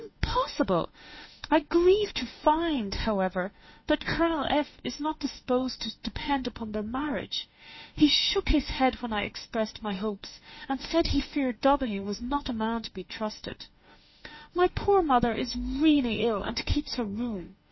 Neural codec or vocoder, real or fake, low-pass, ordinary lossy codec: autoencoder, 48 kHz, 32 numbers a frame, DAC-VAE, trained on Japanese speech; fake; 7.2 kHz; MP3, 24 kbps